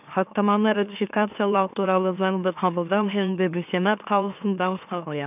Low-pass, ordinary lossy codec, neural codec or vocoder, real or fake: 3.6 kHz; none; autoencoder, 44.1 kHz, a latent of 192 numbers a frame, MeloTTS; fake